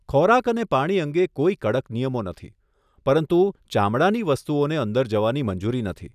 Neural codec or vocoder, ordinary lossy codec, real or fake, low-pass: none; none; real; 14.4 kHz